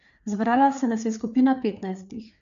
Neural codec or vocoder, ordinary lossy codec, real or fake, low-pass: codec, 16 kHz, 4 kbps, FreqCodec, larger model; none; fake; 7.2 kHz